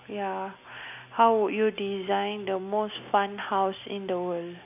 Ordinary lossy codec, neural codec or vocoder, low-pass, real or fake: none; none; 3.6 kHz; real